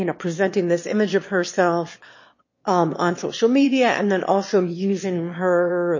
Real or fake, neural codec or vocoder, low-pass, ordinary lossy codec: fake; autoencoder, 22.05 kHz, a latent of 192 numbers a frame, VITS, trained on one speaker; 7.2 kHz; MP3, 32 kbps